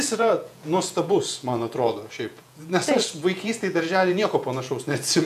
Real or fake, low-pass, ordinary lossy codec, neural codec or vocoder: fake; 14.4 kHz; MP3, 96 kbps; vocoder, 48 kHz, 128 mel bands, Vocos